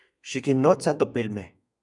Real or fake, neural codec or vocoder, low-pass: fake; codec, 32 kHz, 1.9 kbps, SNAC; 10.8 kHz